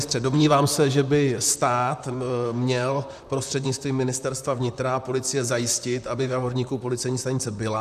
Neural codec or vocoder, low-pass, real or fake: vocoder, 44.1 kHz, 128 mel bands, Pupu-Vocoder; 14.4 kHz; fake